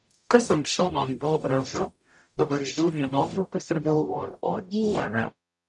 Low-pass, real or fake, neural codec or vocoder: 10.8 kHz; fake; codec, 44.1 kHz, 0.9 kbps, DAC